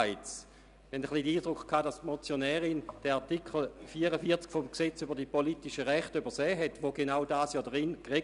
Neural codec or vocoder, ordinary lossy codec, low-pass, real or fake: none; none; 10.8 kHz; real